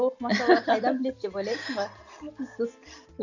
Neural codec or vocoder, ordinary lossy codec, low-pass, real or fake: none; none; 7.2 kHz; real